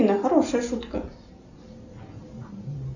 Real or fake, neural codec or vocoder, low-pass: real; none; 7.2 kHz